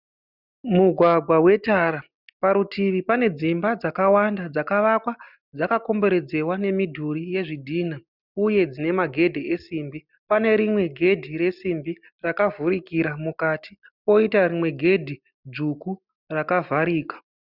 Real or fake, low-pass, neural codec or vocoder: real; 5.4 kHz; none